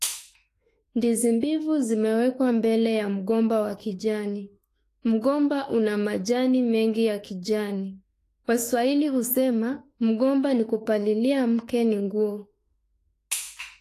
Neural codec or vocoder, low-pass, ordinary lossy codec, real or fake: autoencoder, 48 kHz, 32 numbers a frame, DAC-VAE, trained on Japanese speech; 14.4 kHz; AAC, 48 kbps; fake